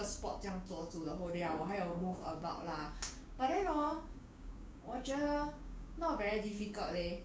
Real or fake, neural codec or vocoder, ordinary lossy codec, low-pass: fake; codec, 16 kHz, 6 kbps, DAC; none; none